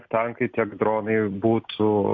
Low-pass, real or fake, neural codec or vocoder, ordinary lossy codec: 7.2 kHz; real; none; MP3, 48 kbps